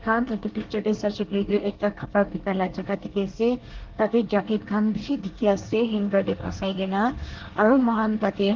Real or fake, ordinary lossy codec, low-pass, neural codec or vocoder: fake; Opus, 16 kbps; 7.2 kHz; codec, 24 kHz, 1 kbps, SNAC